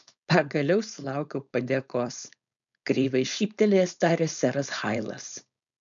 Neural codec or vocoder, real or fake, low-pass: codec, 16 kHz, 4.8 kbps, FACodec; fake; 7.2 kHz